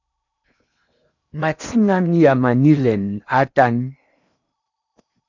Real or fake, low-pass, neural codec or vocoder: fake; 7.2 kHz; codec, 16 kHz in and 24 kHz out, 0.8 kbps, FocalCodec, streaming, 65536 codes